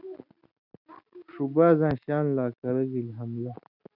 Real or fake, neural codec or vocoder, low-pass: real; none; 5.4 kHz